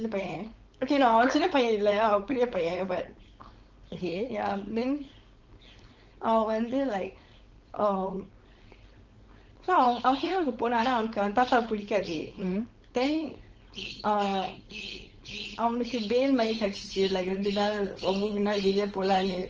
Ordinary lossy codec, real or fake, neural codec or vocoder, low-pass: Opus, 24 kbps; fake; codec, 16 kHz, 4.8 kbps, FACodec; 7.2 kHz